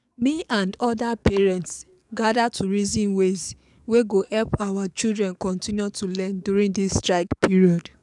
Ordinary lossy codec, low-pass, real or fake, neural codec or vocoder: none; 10.8 kHz; fake; codec, 44.1 kHz, 7.8 kbps, DAC